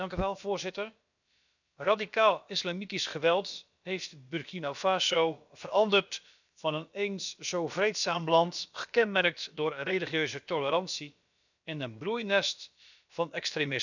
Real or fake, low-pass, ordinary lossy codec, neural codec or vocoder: fake; 7.2 kHz; none; codec, 16 kHz, about 1 kbps, DyCAST, with the encoder's durations